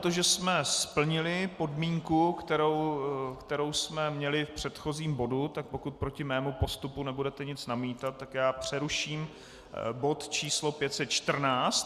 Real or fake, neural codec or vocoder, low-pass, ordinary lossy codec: real; none; 14.4 kHz; Opus, 64 kbps